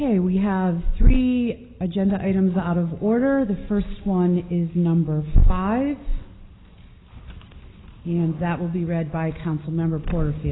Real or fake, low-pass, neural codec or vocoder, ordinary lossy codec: real; 7.2 kHz; none; AAC, 16 kbps